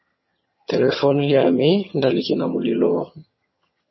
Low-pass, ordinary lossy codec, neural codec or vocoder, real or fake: 7.2 kHz; MP3, 24 kbps; vocoder, 22.05 kHz, 80 mel bands, HiFi-GAN; fake